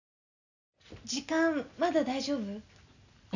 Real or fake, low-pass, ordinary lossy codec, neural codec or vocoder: real; 7.2 kHz; none; none